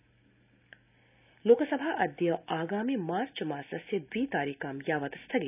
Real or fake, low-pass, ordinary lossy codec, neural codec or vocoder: real; 3.6 kHz; none; none